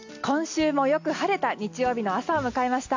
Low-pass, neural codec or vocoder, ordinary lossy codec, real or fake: 7.2 kHz; none; AAC, 48 kbps; real